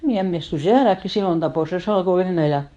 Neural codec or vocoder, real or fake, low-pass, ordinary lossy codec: codec, 24 kHz, 0.9 kbps, WavTokenizer, medium speech release version 2; fake; 10.8 kHz; none